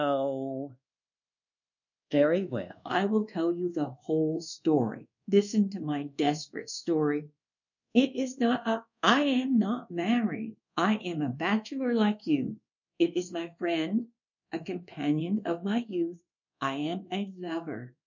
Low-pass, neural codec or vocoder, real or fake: 7.2 kHz; codec, 24 kHz, 1.2 kbps, DualCodec; fake